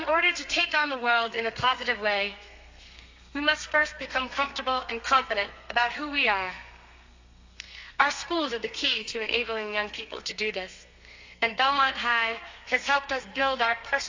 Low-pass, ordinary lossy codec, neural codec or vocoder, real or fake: 7.2 kHz; AAC, 48 kbps; codec, 32 kHz, 1.9 kbps, SNAC; fake